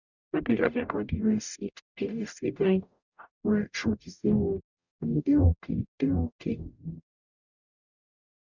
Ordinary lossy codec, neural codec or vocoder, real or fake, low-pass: none; codec, 44.1 kHz, 0.9 kbps, DAC; fake; 7.2 kHz